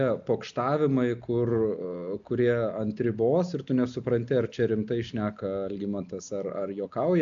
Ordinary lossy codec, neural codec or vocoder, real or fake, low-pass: AAC, 64 kbps; none; real; 7.2 kHz